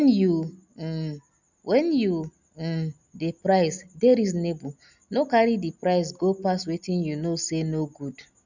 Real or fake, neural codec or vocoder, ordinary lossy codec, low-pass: real; none; none; 7.2 kHz